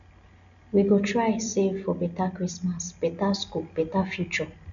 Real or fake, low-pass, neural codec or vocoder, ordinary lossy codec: real; 7.2 kHz; none; none